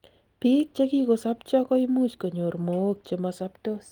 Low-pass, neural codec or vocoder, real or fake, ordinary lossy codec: 19.8 kHz; none; real; Opus, 24 kbps